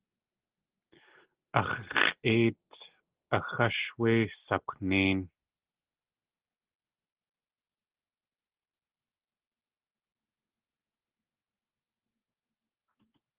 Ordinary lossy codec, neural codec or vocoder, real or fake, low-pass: Opus, 16 kbps; none; real; 3.6 kHz